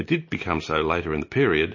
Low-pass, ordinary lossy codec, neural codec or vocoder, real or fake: 7.2 kHz; MP3, 32 kbps; none; real